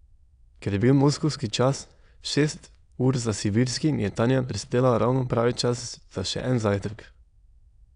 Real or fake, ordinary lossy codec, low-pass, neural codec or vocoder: fake; none; 9.9 kHz; autoencoder, 22.05 kHz, a latent of 192 numbers a frame, VITS, trained on many speakers